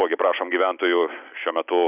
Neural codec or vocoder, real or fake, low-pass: none; real; 3.6 kHz